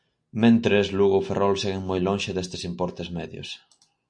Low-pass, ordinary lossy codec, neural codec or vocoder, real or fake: 9.9 kHz; MP3, 64 kbps; none; real